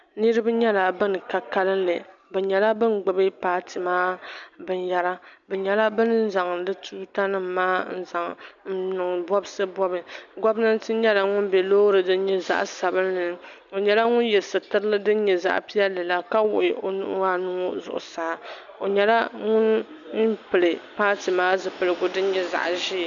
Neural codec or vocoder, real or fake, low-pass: none; real; 7.2 kHz